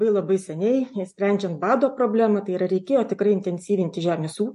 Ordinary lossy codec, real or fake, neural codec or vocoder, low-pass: MP3, 48 kbps; real; none; 14.4 kHz